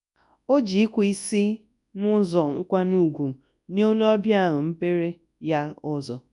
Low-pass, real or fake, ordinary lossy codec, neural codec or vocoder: 10.8 kHz; fake; none; codec, 24 kHz, 0.9 kbps, WavTokenizer, large speech release